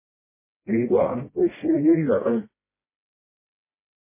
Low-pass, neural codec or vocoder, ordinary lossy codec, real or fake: 3.6 kHz; codec, 16 kHz, 1 kbps, FreqCodec, smaller model; MP3, 16 kbps; fake